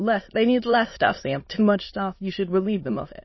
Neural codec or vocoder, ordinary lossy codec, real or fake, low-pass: autoencoder, 22.05 kHz, a latent of 192 numbers a frame, VITS, trained on many speakers; MP3, 24 kbps; fake; 7.2 kHz